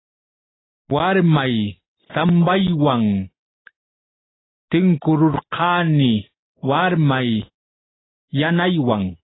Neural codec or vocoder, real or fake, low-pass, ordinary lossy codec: none; real; 7.2 kHz; AAC, 16 kbps